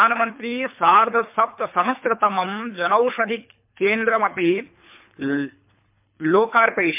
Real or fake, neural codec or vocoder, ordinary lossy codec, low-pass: fake; codec, 24 kHz, 3 kbps, HILCodec; MP3, 32 kbps; 3.6 kHz